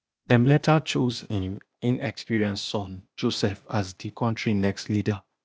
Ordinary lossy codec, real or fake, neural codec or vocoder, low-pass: none; fake; codec, 16 kHz, 0.8 kbps, ZipCodec; none